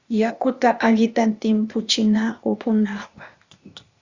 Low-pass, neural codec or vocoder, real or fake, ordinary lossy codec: 7.2 kHz; codec, 16 kHz, 0.8 kbps, ZipCodec; fake; Opus, 64 kbps